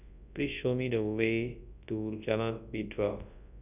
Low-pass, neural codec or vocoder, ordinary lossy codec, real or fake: 3.6 kHz; codec, 24 kHz, 0.9 kbps, WavTokenizer, large speech release; none; fake